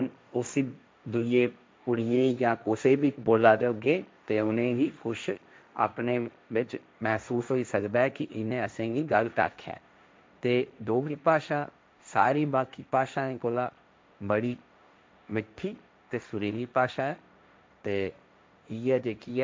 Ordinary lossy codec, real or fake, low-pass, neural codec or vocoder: none; fake; none; codec, 16 kHz, 1.1 kbps, Voila-Tokenizer